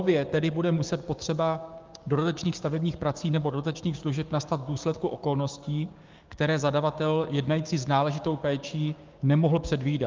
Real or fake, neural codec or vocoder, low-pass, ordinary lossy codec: fake; codec, 16 kHz, 6 kbps, DAC; 7.2 kHz; Opus, 32 kbps